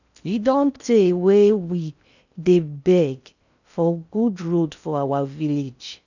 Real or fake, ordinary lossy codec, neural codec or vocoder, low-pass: fake; none; codec, 16 kHz in and 24 kHz out, 0.6 kbps, FocalCodec, streaming, 4096 codes; 7.2 kHz